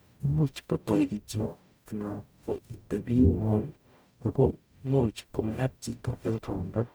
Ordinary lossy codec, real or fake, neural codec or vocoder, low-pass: none; fake; codec, 44.1 kHz, 0.9 kbps, DAC; none